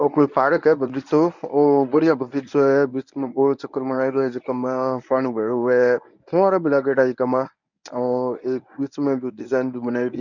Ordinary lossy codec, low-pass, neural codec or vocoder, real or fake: none; 7.2 kHz; codec, 24 kHz, 0.9 kbps, WavTokenizer, medium speech release version 1; fake